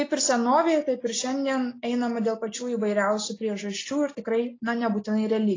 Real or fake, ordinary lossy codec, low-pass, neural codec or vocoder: real; AAC, 32 kbps; 7.2 kHz; none